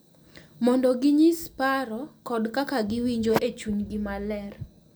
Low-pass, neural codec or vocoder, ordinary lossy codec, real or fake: none; none; none; real